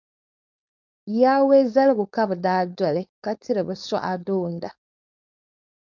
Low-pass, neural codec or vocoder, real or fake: 7.2 kHz; codec, 16 kHz, 4.8 kbps, FACodec; fake